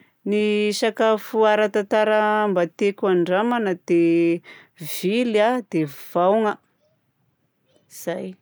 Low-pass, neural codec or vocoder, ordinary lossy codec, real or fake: none; none; none; real